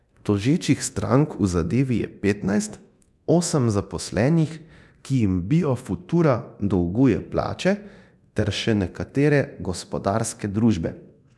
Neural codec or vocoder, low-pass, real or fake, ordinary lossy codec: codec, 24 kHz, 0.9 kbps, DualCodec; none; fake; none